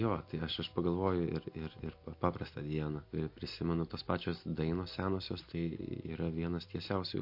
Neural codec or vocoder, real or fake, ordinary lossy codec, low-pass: none; real; MP3, 32 kbps; 5.4 kHz